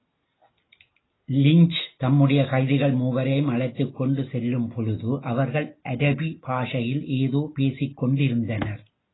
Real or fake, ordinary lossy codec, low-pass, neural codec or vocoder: real; AAC, 16 kbps; 7.2 kHz; none